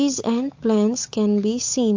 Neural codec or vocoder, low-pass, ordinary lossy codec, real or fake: codec, 16 kHz, 8 kbps, FunCodec, trained on Chinese and English, 25 frames a second; 7.2 kHz; MP3, 48 kbps; fake